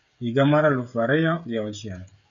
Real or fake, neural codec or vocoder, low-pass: fake; codec, 16 kHz, 16 kbps, FreqCodec, smaller model; 7.2 kHz